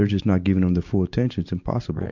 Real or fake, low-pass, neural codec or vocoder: real; 7.2 kHz; none